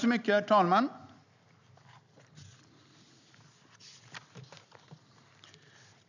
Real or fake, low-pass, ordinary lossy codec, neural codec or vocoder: real; 7.2 kHz; none; none